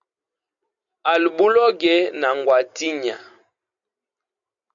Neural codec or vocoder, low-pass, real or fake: none; 7.2 kHz; real